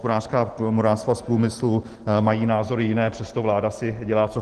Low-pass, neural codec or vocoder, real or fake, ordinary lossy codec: 10.8 kHz; none; real; Opus, 16 kbps